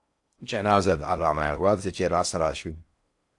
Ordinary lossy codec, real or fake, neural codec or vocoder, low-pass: AAC, 64 kbps; fake; codec, 16 kHz in and 24 kHz out, 0.6 kbps, FocalCodec, streaming, 4096 codes; 10.8 kHz